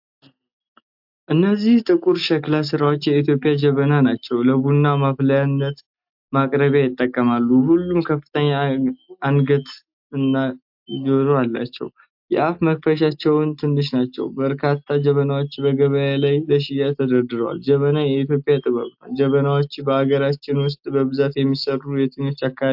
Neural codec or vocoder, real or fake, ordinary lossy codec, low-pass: none; real; MP3, 48 kbps; 5.4 kHz